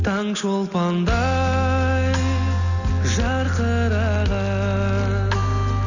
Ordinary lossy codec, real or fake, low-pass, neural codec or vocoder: none; real; 7.2 kHz; none